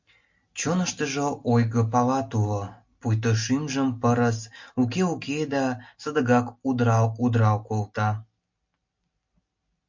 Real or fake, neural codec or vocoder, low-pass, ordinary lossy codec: real; none; 7.2 kHz; MP3, 48 kbps